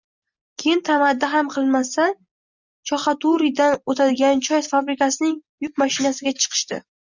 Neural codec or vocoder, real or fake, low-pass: none; real; 7.2 kHz